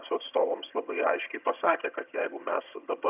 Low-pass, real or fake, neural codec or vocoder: 3.6 kHz; fake; vocoder, 22.05 kHz, 80 mel bands, HiFi-GAN